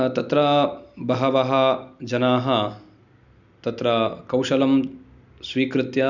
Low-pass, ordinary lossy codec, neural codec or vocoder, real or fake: 7.2 kHz; none; none; real